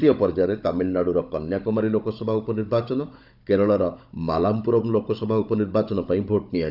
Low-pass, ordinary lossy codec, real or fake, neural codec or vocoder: 5.4 kHz; none; fake; codec, 16 kHz, 16 kbps, FunCodec, trained on Chinese and English, 50 frames a second